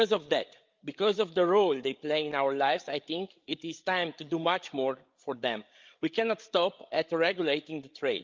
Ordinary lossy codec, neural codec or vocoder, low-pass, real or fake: Opus, 24 kbps; codec, 16 kHz, 8 kbps, FreqCodec, larger model; 7.2 kHz; fake